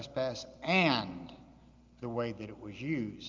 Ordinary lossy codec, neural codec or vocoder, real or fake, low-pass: Opus, 24 kbps; none; real; 7.2 kHz